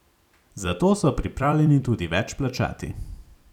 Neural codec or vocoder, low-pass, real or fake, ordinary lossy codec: vocoder, 44.1 kHz, 128 mel bands every 256 samples, BigVGAN v2; 19.8 kHz; fake; none